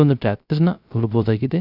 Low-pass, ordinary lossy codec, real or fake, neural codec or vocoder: 5.4 kHz; none; fake; codec, 16 kHz, 0.3 kbps, FocalCodec